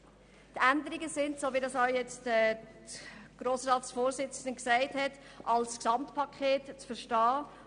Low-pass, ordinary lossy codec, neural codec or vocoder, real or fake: 9.9 kHz; none; none; real